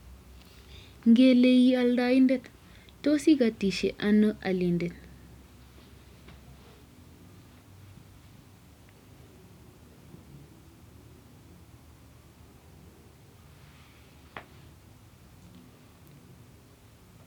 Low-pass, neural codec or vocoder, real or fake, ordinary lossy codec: 19.8 kHz; none; real; none